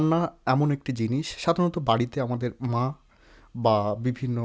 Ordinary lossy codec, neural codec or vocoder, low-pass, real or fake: none; none; none; real